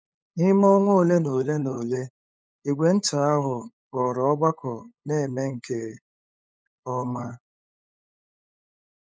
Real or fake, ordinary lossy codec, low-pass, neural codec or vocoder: fake; none; none; codec, 16 kHz, 8 kbps, FunCodec, trained on LibriTTS, 25 frames a second